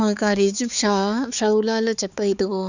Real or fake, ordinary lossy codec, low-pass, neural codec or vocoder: fake; none; 7.2 kHz; codec, 16 kHz, 4 kbps, X-Codec, HuBERT features, trained on balanced general audio